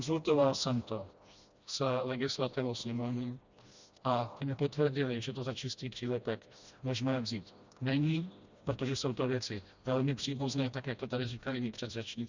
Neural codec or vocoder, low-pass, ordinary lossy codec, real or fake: codec, 16 kHz, 1 kbps, FreqCodec, smaller model; 7.2 kHz; Opus, 64 kbps; fake